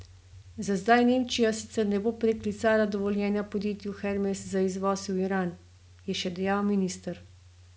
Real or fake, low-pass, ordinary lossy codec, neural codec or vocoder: real; none; none; none